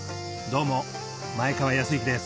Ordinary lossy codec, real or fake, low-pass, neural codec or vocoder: none; real; none; none